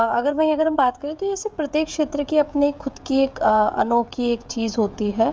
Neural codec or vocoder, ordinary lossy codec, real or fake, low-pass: codec, 16 kHz, 16 kbps, FreqCodec, smaller model; none; fake; none